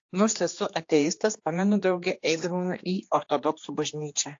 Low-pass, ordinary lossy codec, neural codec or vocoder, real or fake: 7.2 kHz; AAC, 48 kbps; codec, 16 kHz, 2 kbps, X-Codec, HuBERT features, trained on general audio; fake